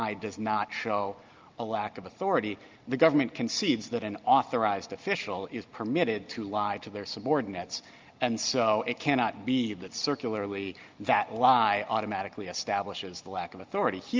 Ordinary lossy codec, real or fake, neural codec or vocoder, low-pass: Opus, 24 kbps; real; none; 7.2 kHz